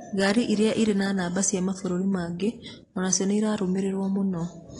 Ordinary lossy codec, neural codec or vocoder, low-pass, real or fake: AAC, 32 kbps; none; 10.8 kHz; real